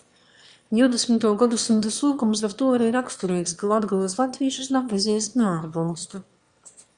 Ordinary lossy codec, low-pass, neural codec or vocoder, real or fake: Opus, 64 kbps; 9.9 kHz; autoencoder, 22.05 kHz, a latent of 192 numbers a frame, VITS, trained on one speaker; fake